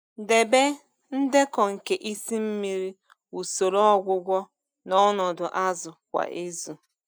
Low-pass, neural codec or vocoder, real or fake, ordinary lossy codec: none; none; real; none